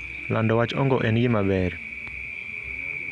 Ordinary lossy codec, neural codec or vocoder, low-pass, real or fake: none; none; 10.8 kHz; real